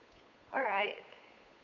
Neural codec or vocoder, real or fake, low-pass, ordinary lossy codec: codec, 16 kHz, 8 kbps, FunCodec, trained on Chinese and English, 25 frames a second; fake; 7.2 kHz; none